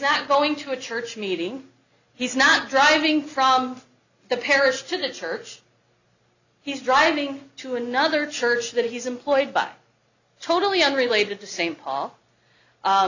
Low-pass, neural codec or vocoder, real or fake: 7.2 kHz; none; real